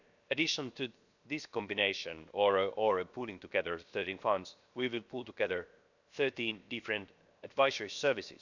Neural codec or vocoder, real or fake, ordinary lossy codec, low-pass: codec, 16 kHz, 0.7 kbps, FocalCodec; fake; none; 7.2 kHz